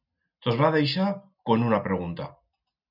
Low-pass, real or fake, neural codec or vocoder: 5.4 kHz; real; none